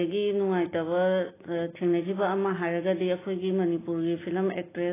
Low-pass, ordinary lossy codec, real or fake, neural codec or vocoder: 3.6 kHz; AAC, 16 kbps; real; none